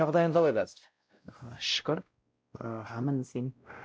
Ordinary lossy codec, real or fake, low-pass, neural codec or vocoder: none; fake; none; codec, 16 kHz, 0.5 kbps, X-Codec, WavLM features, trained on Multilingual LibriSpeech